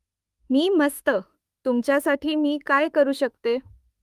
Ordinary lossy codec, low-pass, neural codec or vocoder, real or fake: Opus, 32 kbps; 14.4 kHz; autoencoder, 48 kHz, 32 numbers a frame, DAC-VAE, trained on Japanese speech; fake